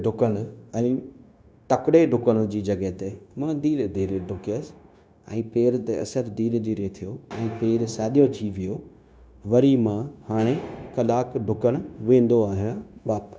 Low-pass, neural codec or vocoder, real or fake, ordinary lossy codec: none; codec, 16 kHz, 0.9 kbps, LongCat-Audio-Codec; fake; none